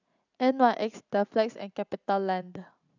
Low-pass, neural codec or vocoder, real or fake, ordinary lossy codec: 7.2 kHz; vocoder, 44.1 kHz, 80 mel bands, Vocos; fake; none